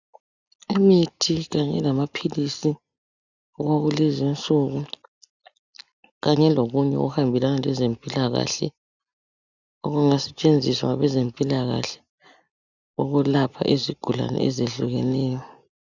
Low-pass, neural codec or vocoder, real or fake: 7.2 kHz; none; real